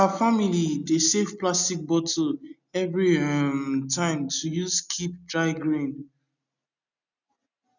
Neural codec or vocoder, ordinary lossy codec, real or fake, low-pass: none; none; real; 7.2 kHz